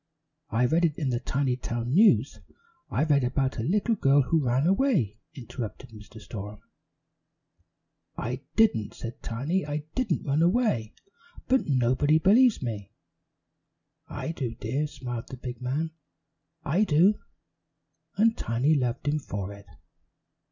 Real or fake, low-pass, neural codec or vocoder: real; 7.2 kHz; none